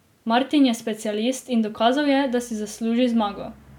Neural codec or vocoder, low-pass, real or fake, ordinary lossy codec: none; 19.8 kHz; real; none